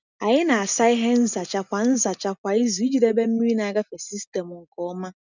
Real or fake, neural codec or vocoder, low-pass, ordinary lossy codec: real; none; 7.2 kHz; none